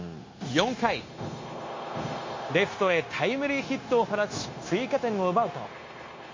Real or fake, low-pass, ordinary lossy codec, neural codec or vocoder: fake; 7.2 kHz; MP3, 32 kbps; codec, 16 kHz, 0.9 kbps, LongCat-Audio-Codec